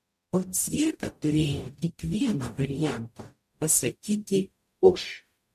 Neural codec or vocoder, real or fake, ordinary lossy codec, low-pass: codec, 44.1 kHz, 0.9 kbps, DAC; fake; MP3, 64 kbps; 14.4 kHz